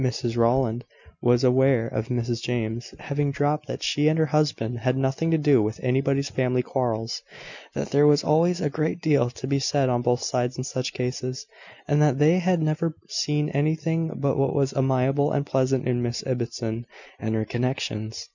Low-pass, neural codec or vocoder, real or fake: 7.2 kHz; none; real